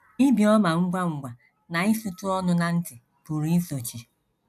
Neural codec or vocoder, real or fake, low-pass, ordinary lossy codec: none; real; 14.4 kHz; none